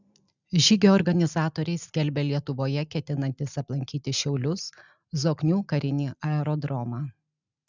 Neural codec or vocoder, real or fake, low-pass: none; real; 7.2 kHz